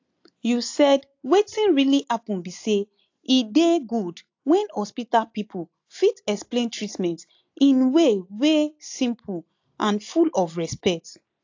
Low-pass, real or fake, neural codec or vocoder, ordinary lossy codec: 7.2 kHz; real; none; AAC, 48 kbps